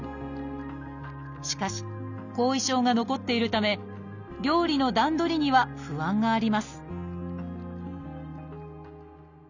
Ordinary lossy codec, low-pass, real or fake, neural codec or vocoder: none; 7.2 kHz; real; none